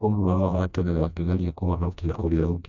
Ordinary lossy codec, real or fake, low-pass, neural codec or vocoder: none; fake; 7.2 kHz; codec, 16 kHz, 1 kbps, FreqCodec, smaller model